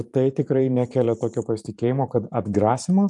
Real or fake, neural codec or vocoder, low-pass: fake; vocoder, 44.1 kHz, 128 mel bands every 512 samples, BigVGAN v2; 10.8 kHz